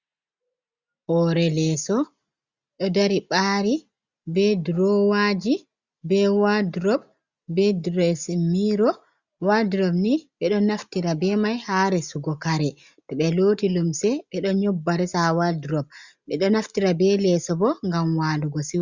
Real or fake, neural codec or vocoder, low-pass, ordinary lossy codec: real; none; 7.2 kHz; Opus, 64 kbps